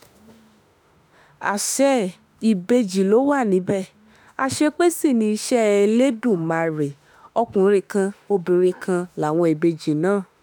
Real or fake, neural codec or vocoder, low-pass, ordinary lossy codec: fake; autoencoder, 48 kHz, 32 numbers a frame, DAC-VAE, trained on Japanese speech; none; none